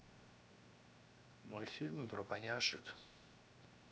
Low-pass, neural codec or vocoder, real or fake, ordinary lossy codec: none; codec, 16 kHz, 0.7 kbps, FocalCodec; fake; none